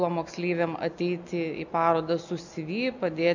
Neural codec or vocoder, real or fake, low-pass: none; real; 7.2 kHz